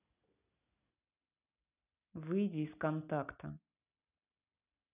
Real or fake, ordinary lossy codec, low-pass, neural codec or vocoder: real; none; 3.6 kHz; none